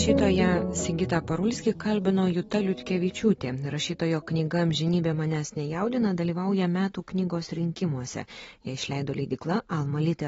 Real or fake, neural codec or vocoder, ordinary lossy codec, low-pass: real; none; AAC, 24 kbps; 19.8 kHz